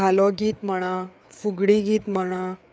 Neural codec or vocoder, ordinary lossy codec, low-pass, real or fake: codec, 16 kHz, 4 kbps, FreqCodec, larger model; none; none; fake